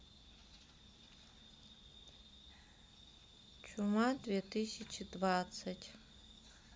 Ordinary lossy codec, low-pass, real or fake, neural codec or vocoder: none; none; real; none